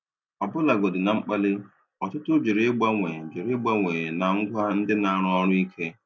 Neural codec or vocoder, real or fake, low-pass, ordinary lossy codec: none; real; none; none